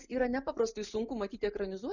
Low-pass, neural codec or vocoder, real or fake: 7.2 kHz; none; real